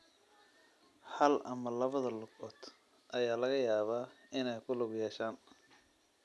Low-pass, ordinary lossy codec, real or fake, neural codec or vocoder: none; none; real; none